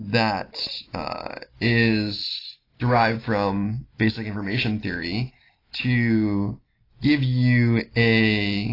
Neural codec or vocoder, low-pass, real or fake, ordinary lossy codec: none; 5.4 kHz; real; AAC, 24 kbps